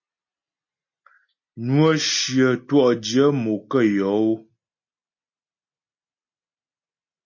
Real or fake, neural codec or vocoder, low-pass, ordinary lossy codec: real; none; 7.2 kHz; MP3, 32 kbps